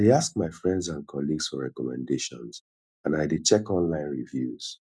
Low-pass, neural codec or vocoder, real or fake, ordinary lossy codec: none; none; real; none